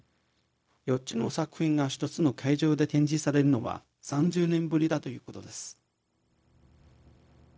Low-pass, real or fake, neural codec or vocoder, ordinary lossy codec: none; fake; codec, 16 kHz, 0.4 kbps, LongCat-Audio-Codec; none